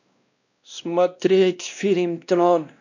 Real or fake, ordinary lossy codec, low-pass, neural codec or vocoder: fake; none; 7.2 kHz; codec, 16 kHz, 1 kbps, X-Codec, WavLM features, trained on Multilingual LibriSpeech